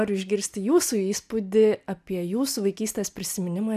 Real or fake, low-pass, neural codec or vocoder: real; 14.4 kHz; none